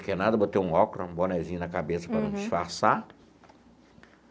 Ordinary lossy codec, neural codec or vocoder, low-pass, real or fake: none; none; none; real